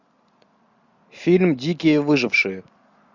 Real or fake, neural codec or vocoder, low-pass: real; none; 7.2 kHz